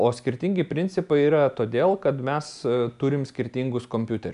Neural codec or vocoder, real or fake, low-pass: none; real; 10.8 kHz